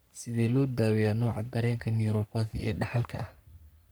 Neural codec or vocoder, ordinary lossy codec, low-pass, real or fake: codec, 44.1 kHz, 3.4 kbps, Pupu-Codec; none; none; fake